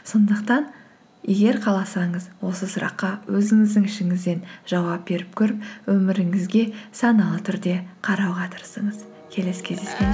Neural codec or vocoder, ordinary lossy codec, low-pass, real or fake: none; none; none; real